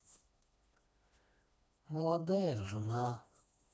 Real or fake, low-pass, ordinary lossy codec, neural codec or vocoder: fake; none; none; codec, 16 kHz, 2 kbps, FreqCodec, smaller model